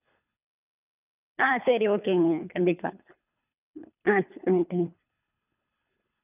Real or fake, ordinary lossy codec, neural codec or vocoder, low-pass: fake; none; codec, 24 kHz, 3 kbps, HILCodec; 3.6 kHz